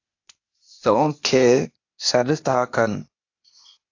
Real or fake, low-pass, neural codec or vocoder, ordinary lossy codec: fake; 7.2 kHz; codec, 16 kHz, 0.8 kbps, ZipCodec; AAC, 48 kbps